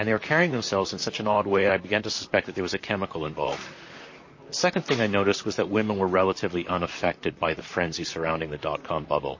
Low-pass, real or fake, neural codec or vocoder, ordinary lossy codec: 7.2 kHz; fake; vocoder, 44.1 kHz, 128 mel bands, Pupu-Vocoder; MP3, 32 kbps